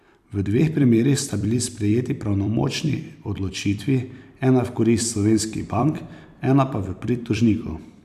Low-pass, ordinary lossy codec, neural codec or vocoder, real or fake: 14.4 kHz; none; vocoder, 44.1 kHz, 128 mel bands every 256 samples, BigVGAN v2; fake